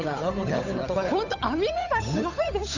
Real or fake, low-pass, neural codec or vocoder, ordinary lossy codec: fake; 7.2 kHz; codec, 16 kHz, 8 kbps, FunCodec, trained on Chinese and English, 25 frames a second; none